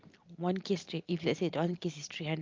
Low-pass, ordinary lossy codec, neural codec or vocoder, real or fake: 7.2 kHz; Opus, 24 kbps; none; real